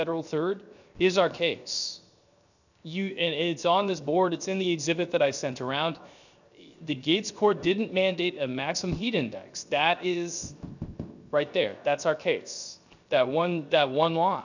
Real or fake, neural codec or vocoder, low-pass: fake; codec, 16 kHz, 0.7 kbps, FocalCodec; 7.2 kHz